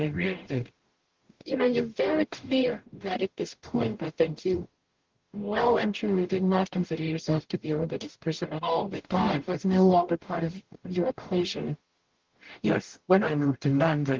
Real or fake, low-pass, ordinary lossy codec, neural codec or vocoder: fake; 7.2 kHz; Opus, 16 kbps; codec, 44.1 kHz, 0.9 kbps, DAC